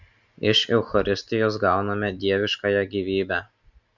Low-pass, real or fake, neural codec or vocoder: 7.2 kHz; real; none